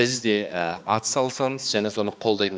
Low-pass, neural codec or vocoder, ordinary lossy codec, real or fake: none; codec, 16 kHz, 2 kbps, X-Codec, HuBERT features, trained on balanced general audio; none; fake